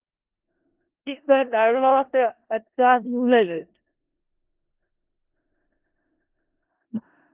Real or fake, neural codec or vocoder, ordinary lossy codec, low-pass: fake; codec, 16 kHz in and 24 kHz out, 0.4 kbps, LongCat-Audio-Codec, four codebook decoder; Opus, 16 kbps; 3.6 kHz